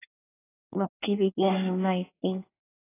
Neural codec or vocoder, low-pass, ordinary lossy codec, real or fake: codec, 24 kHz, 1 kbps, SNAC; 3.6 kHz; AAC, 16 kbps; fake